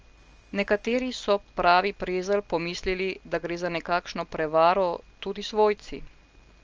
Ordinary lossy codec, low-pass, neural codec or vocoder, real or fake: Opus, 24 kbps; 7.2 kHz; none; real